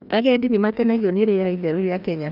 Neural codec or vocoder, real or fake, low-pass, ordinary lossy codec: codec, 16 kHz, 1 kbps, FreqCodec, larger model; fake; 5.4 kHz; none